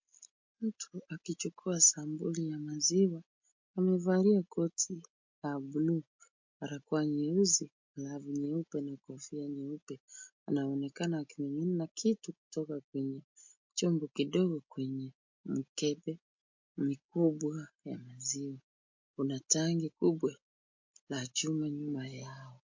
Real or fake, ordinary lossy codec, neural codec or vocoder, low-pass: real; MP3, 48 kbps; none; 7.2 kHz